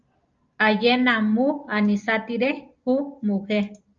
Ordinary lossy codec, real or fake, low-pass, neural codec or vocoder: Opus, 24 kbps; real; 7.2 kHz; none